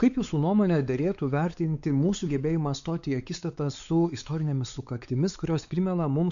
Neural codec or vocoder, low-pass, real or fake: codec, 16 kHz, 4 kbps, X-Codec, WavLM features, trained on Multilingual LibriSpeech; 7.2 kHz; fake